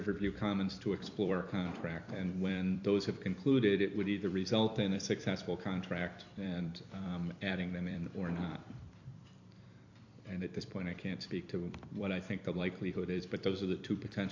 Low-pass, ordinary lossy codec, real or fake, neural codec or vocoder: 7.2 kHz; AAC, 48 kbps; fake; vocoder, 44.1 kHz, 128 mel bands every 256 samples, BigVGAN v2